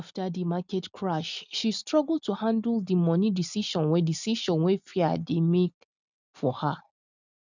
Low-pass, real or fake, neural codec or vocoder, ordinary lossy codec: 7.2 kHz; real; none; none